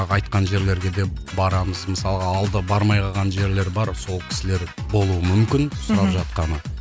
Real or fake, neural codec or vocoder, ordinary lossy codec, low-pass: real; none; none; none